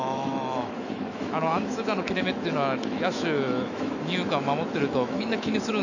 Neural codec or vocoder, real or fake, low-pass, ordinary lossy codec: autoencoder, 48 kHz, 128 numbers a frame, DAC-VAE, trained on Japanese speech; fake; 7.2 kHz; none